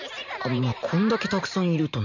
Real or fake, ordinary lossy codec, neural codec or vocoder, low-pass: fake; none; vocoder, 44.1 kHz, 128 mel bands every 512 samples, BigVGAN v2; 7.2 kHz